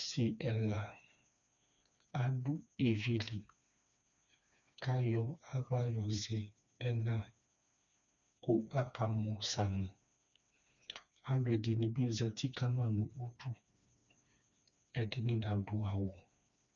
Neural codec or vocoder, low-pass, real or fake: codec, 16 kHz, 2 kbps, FreqCodec, smaller model; 7.2 kHz; fake